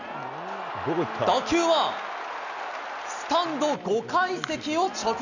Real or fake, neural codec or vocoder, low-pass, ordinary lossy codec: real; none; 7.2 kHz; none